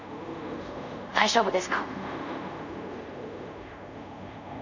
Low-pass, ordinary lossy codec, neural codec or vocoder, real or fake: 7.2 kHz; none; codec, 24 kHz, 0.5 kbps, DualCodec; fake